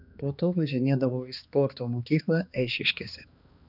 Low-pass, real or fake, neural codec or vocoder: 5.4 kHz; fake; codec, 16 kHz, 2 kbps, X-Codec, HuBERT features, trained on balanced general audio